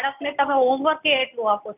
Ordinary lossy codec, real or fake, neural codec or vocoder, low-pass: none; real; none; 3.6 kHz